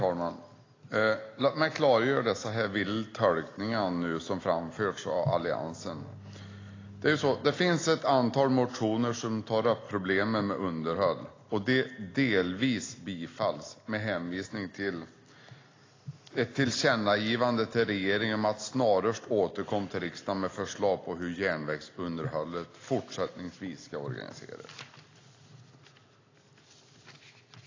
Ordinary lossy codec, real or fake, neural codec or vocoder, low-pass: AAC, 32 kbps; real; none; 7.2 kHz